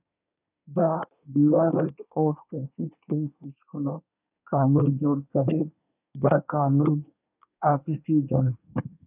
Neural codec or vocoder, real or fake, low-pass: codec, 24 kHz, 1 kbps, SNAC; fake; 3.6 kHz